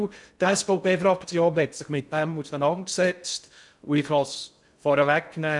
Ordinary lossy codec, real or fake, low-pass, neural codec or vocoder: none; fake; 10.8 kHz; codec, 16 kHz in and 24 kHz out, 0.6 kbps, FocalCodec, streaming, 2048 codes